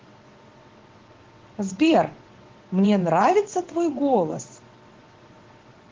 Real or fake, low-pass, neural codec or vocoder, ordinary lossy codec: fake; 7.2 kHz; vocoder, 22.05 kHz, 80 mel bands, WaveNeXt; Opus, 16 kbps